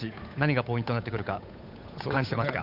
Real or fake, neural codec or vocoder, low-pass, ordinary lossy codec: fake; codec, 16 kHz, 8 kbps, FunCodec, trained on Chinese and English, 25 frames a second; 5.4 kHz; none